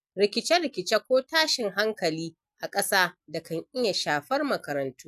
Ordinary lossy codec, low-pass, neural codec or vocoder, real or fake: none; 14.4 kHz; none; real